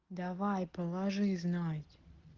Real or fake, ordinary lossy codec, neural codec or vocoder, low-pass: real; Opus, 16 kbps; none; 7.2 kHz